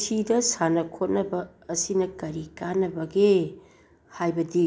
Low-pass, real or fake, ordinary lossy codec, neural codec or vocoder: none; real; none; none